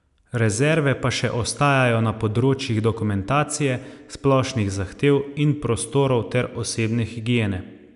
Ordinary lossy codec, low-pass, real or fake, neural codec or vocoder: none; 10.8 kHz; real; none